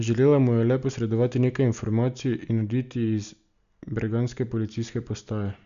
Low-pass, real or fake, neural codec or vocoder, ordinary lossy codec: 7.2 kHz; real; none; none